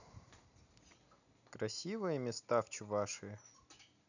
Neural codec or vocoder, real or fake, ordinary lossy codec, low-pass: none; real; none; 7.2 kHz